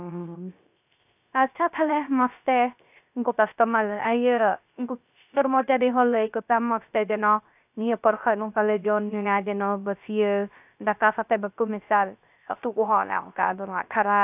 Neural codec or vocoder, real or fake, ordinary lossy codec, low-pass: codec, 16 kHz, 0.3 kbps, FocalCodec; fake; none; 3.6 kHz